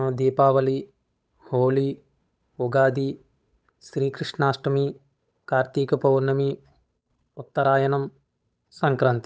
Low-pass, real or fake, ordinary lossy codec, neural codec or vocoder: none; fake; none; codec, 16 kHz, 8 kbps, FunCodec, trained on Chinese and English, 25 frames a second